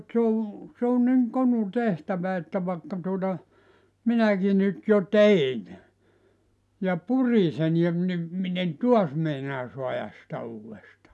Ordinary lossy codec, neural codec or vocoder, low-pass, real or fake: none; none; none; real